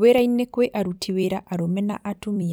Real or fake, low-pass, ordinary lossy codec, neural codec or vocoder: fake; none; none; vocoder, 44.1 kHz, 128 mel bands every 256 samples, BigVGAN v2